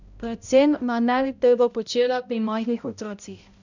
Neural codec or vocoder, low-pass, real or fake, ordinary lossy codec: codec, 16 kHz, 0.5 kbps, X-Codec, HuBERT features, trained on balanced general audio; 7.2 kHz; fake; none